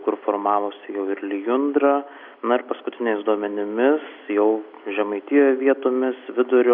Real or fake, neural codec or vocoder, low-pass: real; none; 5.4 kHz